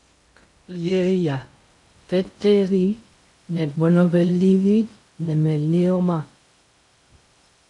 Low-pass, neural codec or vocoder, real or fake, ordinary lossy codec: 10.8 kHz; codec, 16 kHz in and 24 kHz out, 0.6 kbps, FocalCodec, streaming, 2048 codes; fake; AAC, 64 kbps